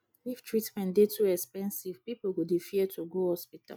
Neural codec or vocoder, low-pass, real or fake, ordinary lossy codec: none; none; real; none